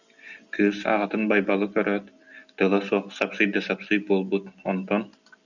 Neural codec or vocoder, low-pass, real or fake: none; 7.2 kHz; real